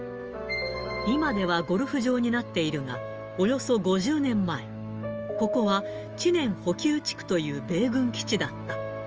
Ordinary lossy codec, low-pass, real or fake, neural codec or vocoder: Opus, 24 kbps; 7.2 kHz; fake; autoencoder, 48 kHz, 128 numbers a frame, DAC-VAE, trained on Japanese speech